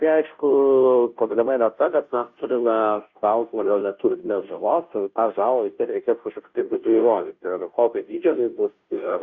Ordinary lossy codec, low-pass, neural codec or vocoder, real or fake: Opus, 64 kbps; 7.2 kHz; codec, 16 kHz, 0.5 kbps, FunCodec, trained on Chinese and English, 25 frames a second; fake